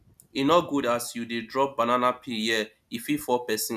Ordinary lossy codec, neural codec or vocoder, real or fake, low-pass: none; none; real; 14.4 kHz